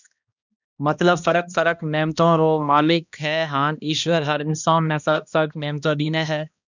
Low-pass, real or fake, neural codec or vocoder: 7.2 kHz; fake; codec, 16 kHz, 1 kbps, X-Codec, HuBERT features, trained on balanced general audio